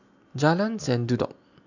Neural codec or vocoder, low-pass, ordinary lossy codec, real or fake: none; 7.2 kHz; none; real